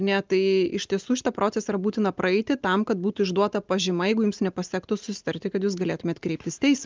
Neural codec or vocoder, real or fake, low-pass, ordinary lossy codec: none; real; 7.2 kHz; Opus, 24 kbps